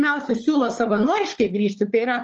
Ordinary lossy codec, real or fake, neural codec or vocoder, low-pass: Opus, 24 kbps; fake; codec, 16 kHz, 4 kbps, FunCodec, trained on LibriTTS, 50 frames a second; 7.2 kHz